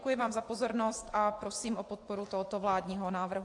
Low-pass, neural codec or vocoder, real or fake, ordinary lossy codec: 10.8 kHz; vocoder, 24 kHz, 100 mel bands, Vocos; fake; AAC, 48 kbps